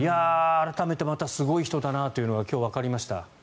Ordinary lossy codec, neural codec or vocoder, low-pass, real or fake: none; none; none; real